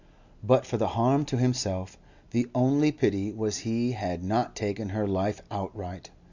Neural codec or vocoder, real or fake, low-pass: none; real; 7.2 kHz